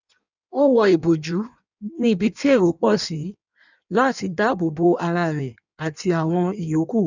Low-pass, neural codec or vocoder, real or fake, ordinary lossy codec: 7.2 kHz; codec, 16 kHz in and 24 kHz out, 1.1 kbps, FireRedTTS-2 codec; fake; none